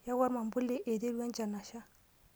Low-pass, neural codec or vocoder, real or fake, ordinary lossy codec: none; none; real; none